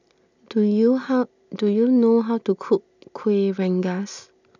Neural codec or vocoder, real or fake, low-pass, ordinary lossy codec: none; real; 7.2 kHz; none